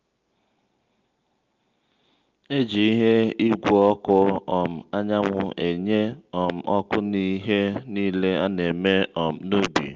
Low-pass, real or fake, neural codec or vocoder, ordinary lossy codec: 7.2 kHz; real; none; Opus, 32 kbps